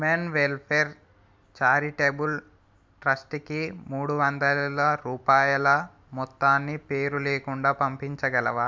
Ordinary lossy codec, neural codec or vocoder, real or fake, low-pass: none; none; real; none